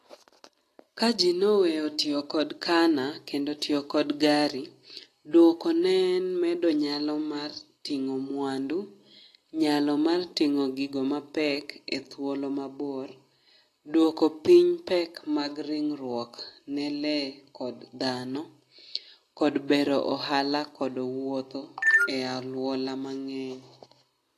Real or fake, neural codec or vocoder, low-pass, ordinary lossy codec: real; none; 14.4 kHz; AAC, 48 kbps